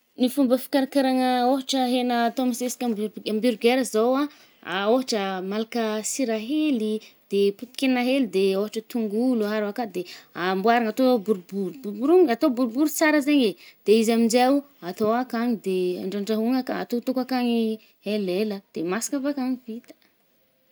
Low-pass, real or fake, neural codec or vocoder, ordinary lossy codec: none; real; none; none